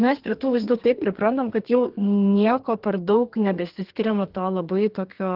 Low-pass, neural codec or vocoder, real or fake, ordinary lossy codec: 5.4 kHz; codec, 32 kHz, 1.9 kbps, SNAC; fake; Opus, 16 kbps